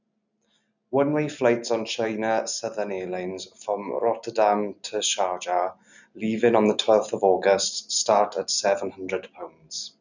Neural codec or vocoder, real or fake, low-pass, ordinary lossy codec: none; real; 7.2 kHz; none